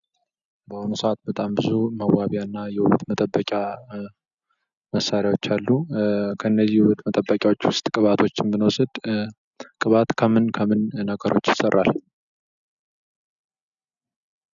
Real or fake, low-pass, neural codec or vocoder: real; 7.2 kHz; none